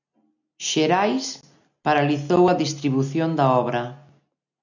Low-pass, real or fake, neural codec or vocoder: 7.2 kHz; real; none